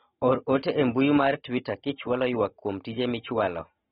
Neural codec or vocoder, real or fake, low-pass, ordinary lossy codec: none; real; 19.8 kHz; AAC, 16 kbps